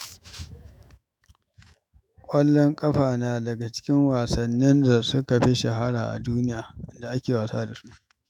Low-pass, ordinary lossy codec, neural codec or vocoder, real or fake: 19.8 kHz; none; autoencoder, 48 kHz, 128 numbers a frame, DAC-VAE, trained on Japanese speech; fake